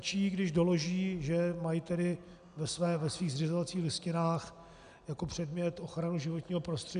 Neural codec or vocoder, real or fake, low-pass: none; real; 9.9 kHz